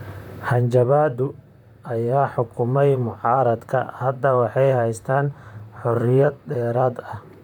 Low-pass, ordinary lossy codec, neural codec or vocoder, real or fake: 19.8 kHz; none; vocoder, 44.1 kHz, 128 mel bands, Pupu-Vocoder; fake